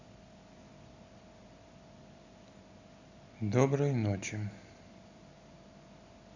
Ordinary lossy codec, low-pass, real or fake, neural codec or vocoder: Opus, 64 kbps; 7.2 kHz; real; none